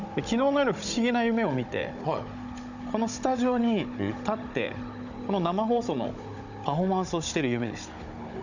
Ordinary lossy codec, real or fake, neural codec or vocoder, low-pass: none; fake; codec, 16 kHz, 16 kbps, FunCodec, trained on Chinese and English, 50 frames a second; 7.2 kHz